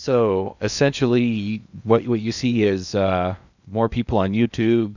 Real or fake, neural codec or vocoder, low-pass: fake; codec, 16 kHz in and 24 kHz out, 0.8 kbps, FocalCodec, streaming, 65536 codes; 7.2 kHz